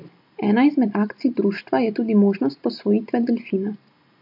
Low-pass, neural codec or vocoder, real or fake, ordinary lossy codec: 5.4 kHz; none; real; MP3, 48 kbps